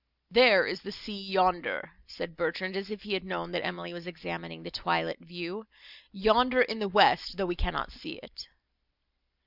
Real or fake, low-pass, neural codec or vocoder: real; 5.4 kHz; none